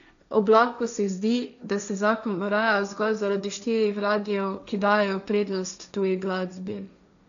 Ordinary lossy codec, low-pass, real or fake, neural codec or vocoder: none; 7.2 kHz; fake; codec, 16 kHz, 1.1 kbps, Voila-Tokenizer